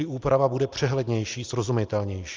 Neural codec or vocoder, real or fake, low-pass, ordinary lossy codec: none; real; 7.2 kHz; Opus, 24 kbps